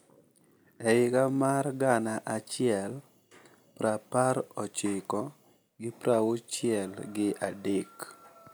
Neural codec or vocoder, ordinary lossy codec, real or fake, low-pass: none; none; real; none